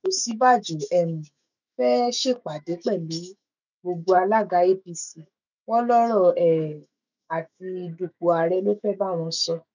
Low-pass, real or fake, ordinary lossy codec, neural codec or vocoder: 7.2 kHz; fake; none; autoencoder, 48 kHz, 128 numbers a frame, DAC-VAE, trained on Japanese speech